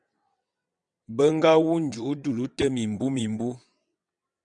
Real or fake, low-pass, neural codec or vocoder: fake; 9.9 kHz; vocoder, 22.05 kHz, 80 mel bands, WaveNeXt